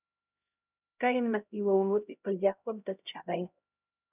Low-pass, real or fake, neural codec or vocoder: 3.6 kHz; fake; codec, 16 kHz, 0.5 kbps, X-Codec, HuBERT features, trained on LibriSpeech